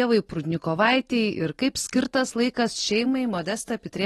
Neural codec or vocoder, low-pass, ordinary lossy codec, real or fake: none; 19.8 kHz; AAC, 32 kbps; real